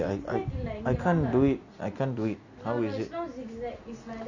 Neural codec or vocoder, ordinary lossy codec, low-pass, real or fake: none; none; 7.2 kHz; real